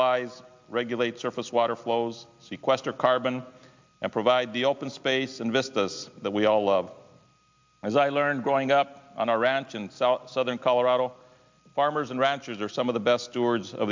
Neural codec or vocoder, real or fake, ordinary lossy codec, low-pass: none; real; MP3, 64 kbps; 7.2 kHz